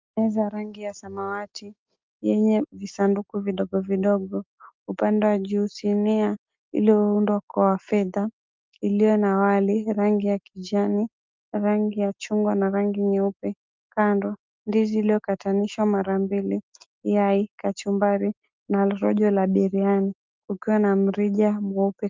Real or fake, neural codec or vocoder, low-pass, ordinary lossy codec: real; none; 7.2 kHz; Opus, 24 kbps